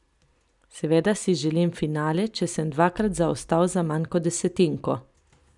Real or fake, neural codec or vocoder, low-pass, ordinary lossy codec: real; none; 10.8 kHz; none